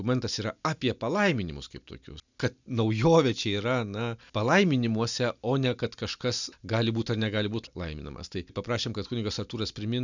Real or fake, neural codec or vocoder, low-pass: real; none; 7.2 kHz